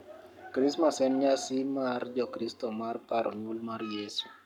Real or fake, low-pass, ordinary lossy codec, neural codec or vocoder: fake; 19.8 kHz; none; codec, 44.1 kHz, 7.8 kbps, Pupu-Codec